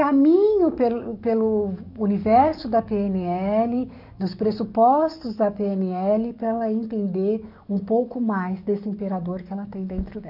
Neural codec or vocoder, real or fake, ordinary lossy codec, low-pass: codec, 44.1 kHz, 7.8 kbps, DAC; fake; none; 5.4 kHz